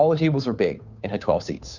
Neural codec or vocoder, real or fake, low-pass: codec, 16 kHz, 2 kbps, FunCodec, trained on Chinese and English, 25 frames a second; fake; 7.2 kHz